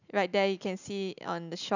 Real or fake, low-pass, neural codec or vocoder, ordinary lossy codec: real; 7.2 kHz; none; none